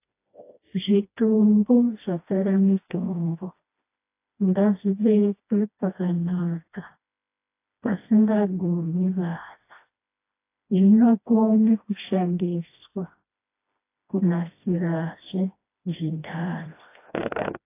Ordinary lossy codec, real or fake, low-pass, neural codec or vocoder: AAC, 24 kbps; fake; 3.6 kHz; codec, 16 kHz, 1 kbps, FreqCodec, smaller model